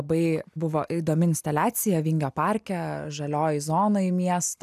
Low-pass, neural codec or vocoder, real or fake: 14.4 kHz; none; real